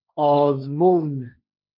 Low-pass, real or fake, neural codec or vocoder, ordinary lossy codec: 5.4 kHz; fake; codec, 16 kHz, 1.1 kbps, Voila-Tokenizer; MP3, 48 kbps